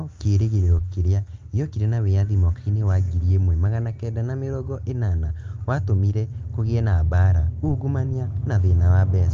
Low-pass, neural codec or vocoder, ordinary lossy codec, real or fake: 7.2 kHz; none; Opus, 32 kbps; real